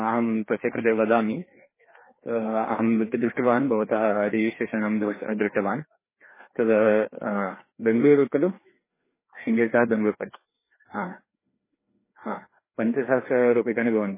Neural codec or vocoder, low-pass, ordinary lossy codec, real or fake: codec, 16 kHz, 2 kbps, FreqCodec, larger model; 3.6 kHz; MP3, 16 kbps; fake